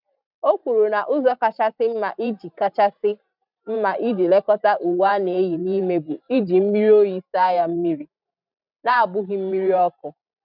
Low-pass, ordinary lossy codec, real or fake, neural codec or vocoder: 5.4 kHz; none; fake; vocoder, 44.1 kHz, 128 mel bands every 512 samples, BigVGAN v2